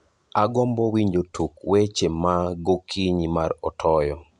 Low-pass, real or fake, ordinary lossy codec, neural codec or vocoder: 10.8 kHz; real; none; none